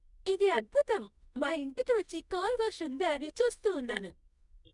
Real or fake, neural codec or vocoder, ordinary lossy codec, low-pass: fake; codec, 24 kHz, 0.9 kbps, WavTokenizer, medium music audio release; none; 10.8 kHz